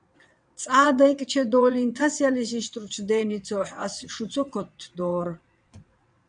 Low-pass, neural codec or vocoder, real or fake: 9.9 kHz; vocoder, 22.05 kHz, 80 mel bands, WaveNeXt; fake